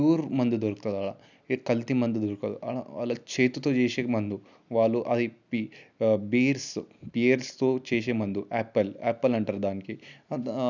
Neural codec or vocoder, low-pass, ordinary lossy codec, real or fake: none; 7.2 kHz; none; real